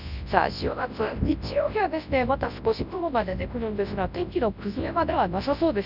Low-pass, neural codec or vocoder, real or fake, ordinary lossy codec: 5.4 kHz; codec, 24 kHz, 0.9 kbps, WavTokenizer, large speech release; fake; none